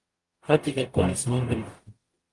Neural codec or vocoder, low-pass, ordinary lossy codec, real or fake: codec, 44.1 kHz, 0.9 kbps, DAC; 10.8 kHz; Opus, 24 kbps; fake